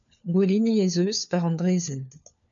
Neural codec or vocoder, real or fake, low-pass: codec, 16 kHz, 4 kbps, FunCodec, trained on LibriTTS, 50 frames a second; fake; 7.2 kHz